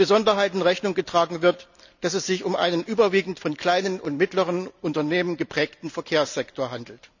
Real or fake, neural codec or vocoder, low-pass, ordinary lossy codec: real; none; 7.2 kHz; none